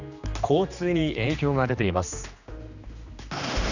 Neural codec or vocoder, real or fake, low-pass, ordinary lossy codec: codec, 16 kHz, 2 kbps, X-Codec, HuBERT features, trained on general audio; fake; 7.2 kHz; none